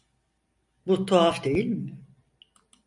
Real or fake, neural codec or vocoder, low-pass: real; none; 10.8 kHz